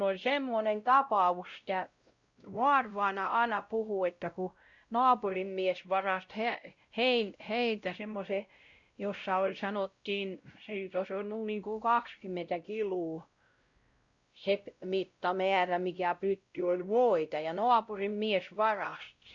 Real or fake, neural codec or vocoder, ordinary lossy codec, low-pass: fake; codec, 16 kHz, 0.5 kbps, X-Codec, WavLM features, trained on Multilingual LibriSpeech; Opus, 64 kbps; 7.2 kHz